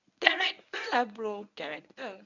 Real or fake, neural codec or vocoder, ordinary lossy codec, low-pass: fake; codec, 24 kHz, 0.9 kbps, WavTokenizer, medium speech release version 1; none; 7.2 kHz